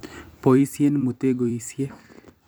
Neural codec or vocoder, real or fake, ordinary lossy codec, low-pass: vocoder, 44.1 kHz, 128 mel bands every 256 samples, BigVGAN v2; fake; none; none